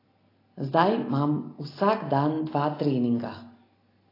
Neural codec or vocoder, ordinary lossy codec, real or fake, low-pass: none; AAC, 24 kbps; real; 5.4 kHz